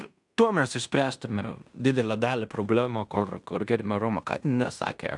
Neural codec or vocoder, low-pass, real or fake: codec, 16 kHz in and 24 kHz out, 0.9 kbps, LongCat-Audio-Codec, fine tuned four codebook decoder; 10.8 kHz; fake